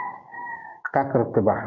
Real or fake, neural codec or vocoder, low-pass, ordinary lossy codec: fake; codec, 16 kHz, 6 kbps, DAC; 7.2 kHz; AAC, 48 kbps